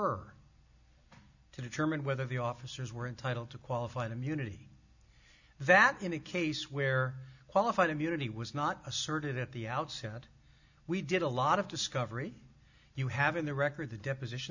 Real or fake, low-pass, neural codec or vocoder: real; 7.2 kHz; none